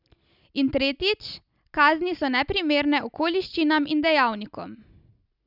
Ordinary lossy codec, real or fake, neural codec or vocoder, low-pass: none; real; none; 5.4 kHz